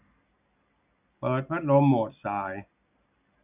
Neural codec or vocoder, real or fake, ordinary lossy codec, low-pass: none; real; none; 3.6 kHz